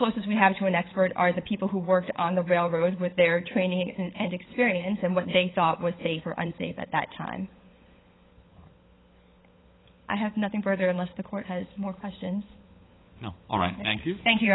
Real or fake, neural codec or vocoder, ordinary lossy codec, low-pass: fake; codec, 16 kHz, 16 kbps, FunCodec, trained on LibriTTS, 50 frames a second; AAC, 16 kbps; 7.2 kHz